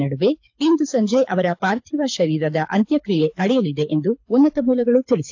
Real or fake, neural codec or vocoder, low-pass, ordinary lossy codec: fake; codec, 24 kHz, 6 kbps, HILCodec; 7.2 kHz; AAC, 48 kbps